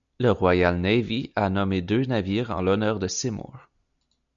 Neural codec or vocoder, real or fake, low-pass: none; real; 7.2 kHz